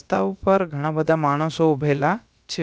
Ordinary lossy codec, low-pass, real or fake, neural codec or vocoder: none; none; fake; codec, 16 kHz, about 1 kbps, DyCAST, with the encoder's durations